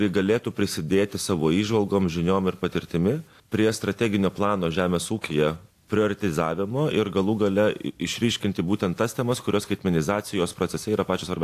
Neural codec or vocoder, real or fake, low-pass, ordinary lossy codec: none; real; 14.4 kHz; AAC, 64 kbps